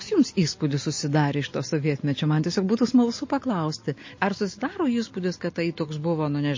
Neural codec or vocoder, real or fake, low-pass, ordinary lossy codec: none; real; 7.2 kHz; MP3, 32 kbps